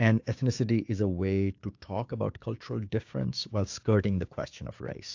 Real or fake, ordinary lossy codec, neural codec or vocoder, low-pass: fake; AAC, 48 kbps; codec, 16 kHz, 6 kbps, DAC; 7.2 kHz